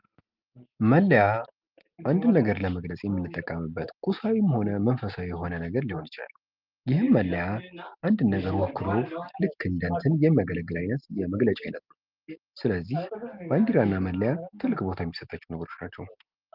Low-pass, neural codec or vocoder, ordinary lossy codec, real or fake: 5.4 kHz; none; Opus, 32 kbps; real